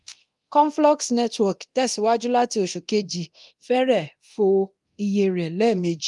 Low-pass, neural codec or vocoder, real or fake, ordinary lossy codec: 10.8 kHz; codec, 24 kHz, 0.9 kbps, DualCodec; fake; Opus, 32 kbps